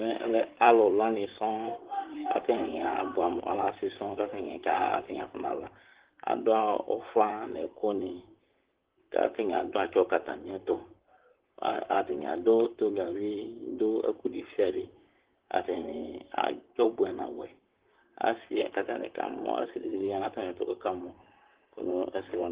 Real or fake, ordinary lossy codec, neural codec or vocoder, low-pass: fake; Opus, 16 kbps; vocoder, 44.1 kHz, 128 mel bands, Pupu-Vocoder; 3.6 kHz